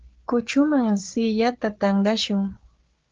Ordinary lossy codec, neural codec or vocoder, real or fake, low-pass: Opus, 16 kbps; codec, 16 kHz, 4 kbps, FreqCodec, larger model; fake; 7.2 kHz